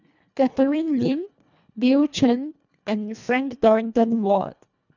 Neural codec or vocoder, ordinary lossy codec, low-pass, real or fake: codec, 24 kHz, 1.5 kbps, HILCodec; none; 7.2 kHz; fake